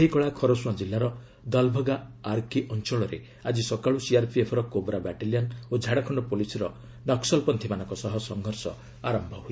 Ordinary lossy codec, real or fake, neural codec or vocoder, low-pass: none; real; none; none